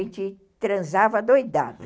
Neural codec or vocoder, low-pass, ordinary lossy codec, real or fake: none; none; none; real